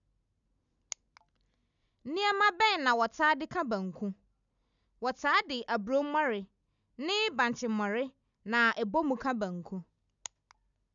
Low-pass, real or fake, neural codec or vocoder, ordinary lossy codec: 7.2 kHz; real; none; none